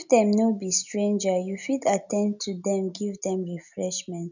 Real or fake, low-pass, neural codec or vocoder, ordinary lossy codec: real; 7.2 kHz; none; none